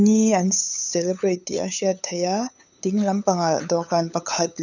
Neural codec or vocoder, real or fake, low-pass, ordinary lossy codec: codec, 16 kHz, 8 kbps, FunCodec, trained on LibriTTS, 25 frames a second; fake; 7.2 kHz; none